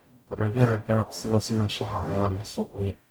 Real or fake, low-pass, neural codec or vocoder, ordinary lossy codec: fake; none; codec, 44.1 kHz, 0.9 kbps, DAC; none